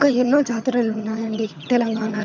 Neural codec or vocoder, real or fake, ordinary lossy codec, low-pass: vocoder, 22.05 kHz, 80 mel bands, HiFi-GAN; fake; none; 7.2 kHz